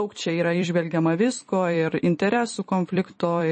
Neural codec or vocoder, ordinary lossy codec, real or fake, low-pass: vocoder, 44.1 kHz, 128 mel bands every 256 samples, BigVGAN v2; MP3, 32 kbps; fake; 10.8 kHz